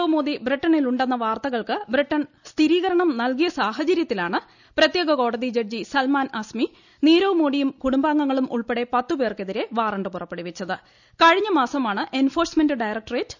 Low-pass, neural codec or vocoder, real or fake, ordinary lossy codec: 7.2 kHz; none; real; none